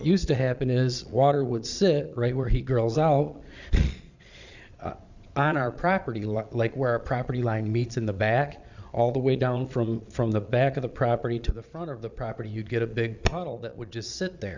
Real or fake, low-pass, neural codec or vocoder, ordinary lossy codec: fake; 7.2 kHz; vocoder, 22.05 kHz, 80 mel bands, Vocos; Opus, 64 kbps